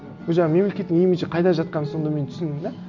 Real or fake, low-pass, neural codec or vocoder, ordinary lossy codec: real; 7.2 kHz; none; none